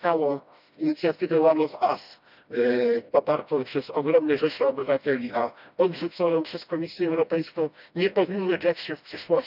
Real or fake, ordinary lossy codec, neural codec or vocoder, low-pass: fake; none; codec, 16 kHz, 1 kbps, FreqCodec, smaller model; 5.4 kHz